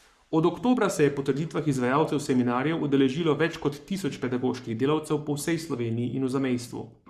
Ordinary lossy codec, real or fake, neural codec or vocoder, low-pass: Opus, 64 kbps; fake; codec, 44.1 kHz, 7.8 kbps, Pupu-Codec; 14.4 kHz